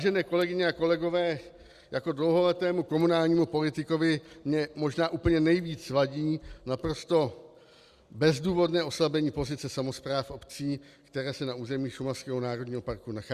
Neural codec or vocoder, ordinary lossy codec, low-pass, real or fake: none; Opus, 64 kbps; 14.4 kHz; real